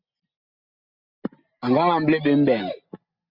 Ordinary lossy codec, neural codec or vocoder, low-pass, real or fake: Opus, 64 kbps; none; 5.4 kHz; real